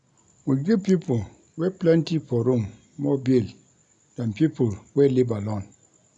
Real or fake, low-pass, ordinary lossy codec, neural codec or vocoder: real; 10.8 kHz; none; none